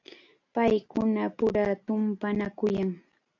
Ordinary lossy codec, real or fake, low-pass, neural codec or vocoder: AAC, 48 kbps; real; 7.2 kHz; none